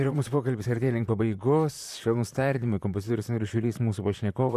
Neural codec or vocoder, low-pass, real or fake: vocoder, 44.1 kHz, 128 mel bands, Pupu-Vocoder; 14.4 kHz; fake